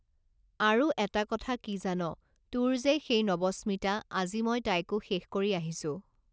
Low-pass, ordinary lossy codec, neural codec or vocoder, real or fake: none; none; none; real